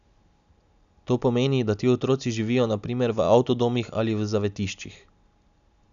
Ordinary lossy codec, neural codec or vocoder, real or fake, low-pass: none; none; real; 7.2 kHz